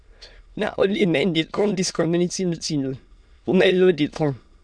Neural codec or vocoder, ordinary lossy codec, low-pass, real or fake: autoencoder, 22.05 kHz, a latent of 192 numbers a frame, VITS, trained on many speakers; none; 9.9 kHz; fake